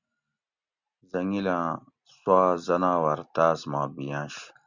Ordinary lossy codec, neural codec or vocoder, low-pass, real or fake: Opus, 64 kbps; none; 7.2 kHz; real